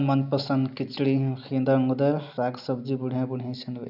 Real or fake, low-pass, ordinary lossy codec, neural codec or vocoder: real; 5.4 kHz; none; none